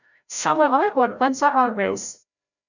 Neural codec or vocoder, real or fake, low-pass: codec, 16 kHz, 0.5 kbps, FreqCodec, larger model; fake; 7.2 kHz